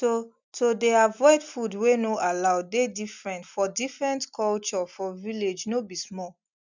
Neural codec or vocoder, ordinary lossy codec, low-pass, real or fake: none; none; 7.2 kHz; real